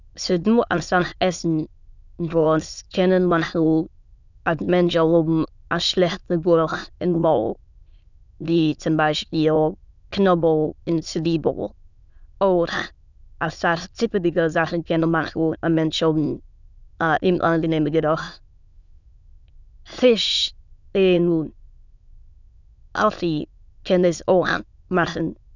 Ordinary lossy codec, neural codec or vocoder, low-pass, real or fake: none; autoencoder, 22.05 kHz, a latent of 192 numbers a frame, VITS, trained on many speakers; 7.2 kHz; fake